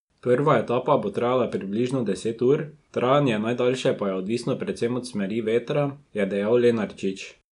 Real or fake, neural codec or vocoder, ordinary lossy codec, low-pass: real; none; none; 10.8 kHz